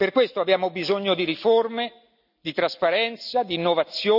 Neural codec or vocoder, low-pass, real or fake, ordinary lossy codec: none; 5.4 kHz; real; none